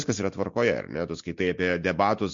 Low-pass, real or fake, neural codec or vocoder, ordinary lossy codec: 7.2 kHz; real; none; MP3, 48 kbps